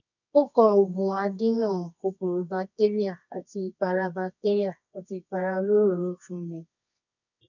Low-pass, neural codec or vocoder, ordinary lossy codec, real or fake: 7.2 kHz; codec, 24 kHz, 0.9 kbps, WavTokenizer, medium music audio release; none; fake